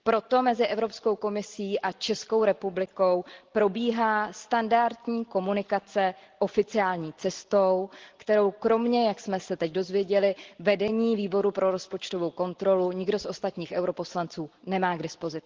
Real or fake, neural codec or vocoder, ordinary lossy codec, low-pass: real; none; Opus, 16 kbps; 7.2 kHz